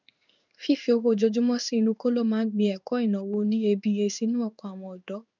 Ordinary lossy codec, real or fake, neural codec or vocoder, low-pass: none; fake; codec, 16 kHz in and 24 kHz out, 1 kbps, XY-Tokenizer; 7.2 kHz